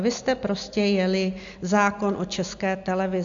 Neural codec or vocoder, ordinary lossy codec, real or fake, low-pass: none; AAC, 64 kbps; real; 7.2 kHz